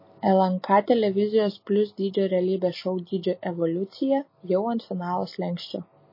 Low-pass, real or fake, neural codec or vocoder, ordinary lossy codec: 5.4 kHz; real; none; MP3, 24 kbps